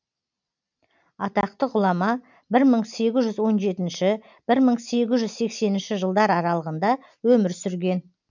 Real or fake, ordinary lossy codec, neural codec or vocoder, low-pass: real; none; none; 7.2 kHz